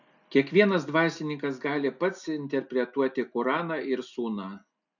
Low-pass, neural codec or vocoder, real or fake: 7.2 kHz; none; real